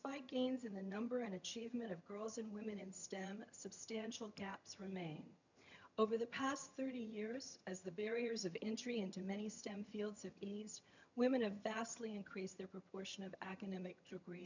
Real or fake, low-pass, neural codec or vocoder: fake; 7.2 kHz; vocoder, 22.05 kHz, 80 mel bands, HiFi-GAN